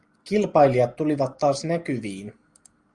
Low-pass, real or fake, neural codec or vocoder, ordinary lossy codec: 10.8 kHz; real; none; Opus, 32 kbps